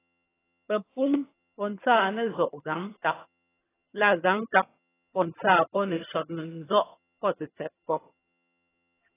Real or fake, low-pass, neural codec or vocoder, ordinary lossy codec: fake; 3.6 kHz; vocoder, 22.05 kHz, 80 mel bands, HiFi-GAN; AAC, 16 kbps